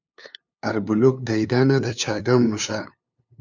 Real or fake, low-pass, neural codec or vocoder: fake; 7.2 kHz; codec, 16 kHz, 2 kbps, FunCodec, trained on LibriTTS, 25 frames a second